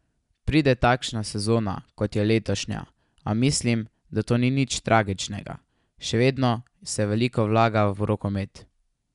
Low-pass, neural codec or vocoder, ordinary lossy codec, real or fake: 10.8 kHz; none; none; real